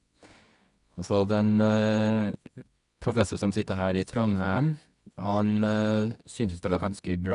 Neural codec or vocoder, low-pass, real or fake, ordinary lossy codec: codec, 24 kHz, 0.9 kbps, WavTokenizer, medium music audio release; 10.8 kHz; fake; none